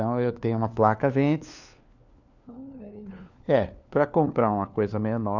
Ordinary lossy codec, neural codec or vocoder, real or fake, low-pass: none; codec, 16 kHz, 4 kbps, FunCodec, trained on LibriTTS, 50 frames a second; fake; 7.2 kHz